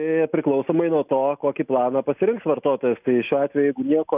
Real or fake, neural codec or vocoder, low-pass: real; none; 3.6 kHz